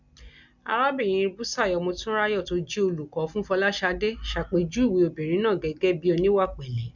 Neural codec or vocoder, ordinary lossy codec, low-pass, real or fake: none; none; 7.2 kHz; real